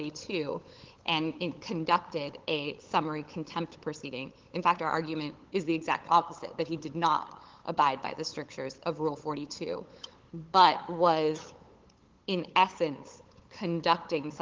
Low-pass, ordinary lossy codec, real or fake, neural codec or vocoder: 7.2 kHz; Opus, 16 kbps; fake; codec, 16 kHz, 8 kbps, FunCodec, trained on LibriTTS, 25 frames a second